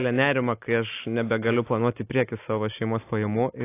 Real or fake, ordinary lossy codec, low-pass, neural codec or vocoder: real; AAC, 24 kbps; 3.6 kHz; none